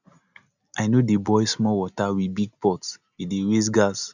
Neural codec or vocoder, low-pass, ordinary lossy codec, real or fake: none; 7.2 kHz; none; real